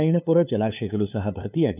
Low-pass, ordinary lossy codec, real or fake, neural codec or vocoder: 3.6 kHz; none; fake; codec, 16 kHz, 2 kbps, FunCodec, trained on LibriTTS, 25 frames a second